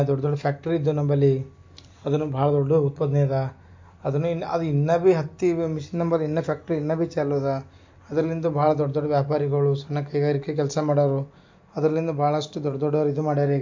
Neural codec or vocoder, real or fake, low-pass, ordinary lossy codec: none; real; 7.2 kHz; MP3, 48 kbps